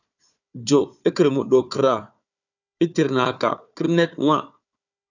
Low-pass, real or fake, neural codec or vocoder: 7.2 kHz; fake; codec, 16 kHz, 4 kbps, FunCodec, trained on Chinese and English, 50 frames a second